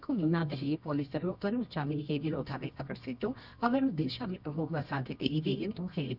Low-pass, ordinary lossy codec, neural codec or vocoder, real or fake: 5.4 kHz; none; codec, 24 kHz, 0.9 kbps, WavTokenizer, medium music audio release; fake